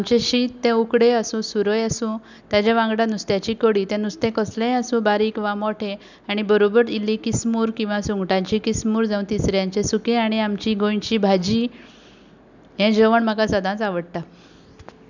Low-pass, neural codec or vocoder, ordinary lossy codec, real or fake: 7.2 kHz; none; none; real